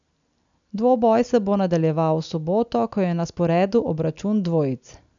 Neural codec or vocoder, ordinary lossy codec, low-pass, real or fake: none; none; 7.2 kHz; real